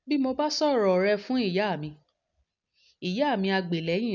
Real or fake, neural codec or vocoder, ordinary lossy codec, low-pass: real; none; none; 7.2 kHz